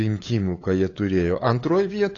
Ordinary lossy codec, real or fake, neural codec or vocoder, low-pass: AAC, 32 kbps; fake; codec, 16 kHz, 8 kbps, FunCodec, trained on LibriTTS, 25 frames a second; 7.2 kHz